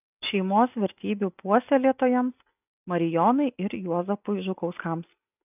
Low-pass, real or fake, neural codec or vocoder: 3.6 kHz; real; none